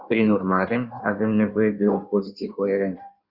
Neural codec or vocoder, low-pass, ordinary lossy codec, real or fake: codec, 16 kHz in and 24 kHz out, 1.1 kbps, FireRedTTS-2 codec; 5.4 kHz; Opus, 64 kbps; fake